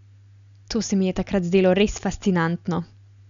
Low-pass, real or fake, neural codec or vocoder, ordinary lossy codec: 7.2 kHz; real; none; none